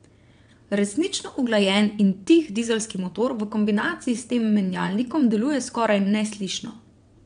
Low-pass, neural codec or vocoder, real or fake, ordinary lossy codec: 9.9 kHz; vocoder, 22.05 kHz, 80 mel bands, WaveNeXt; fake; none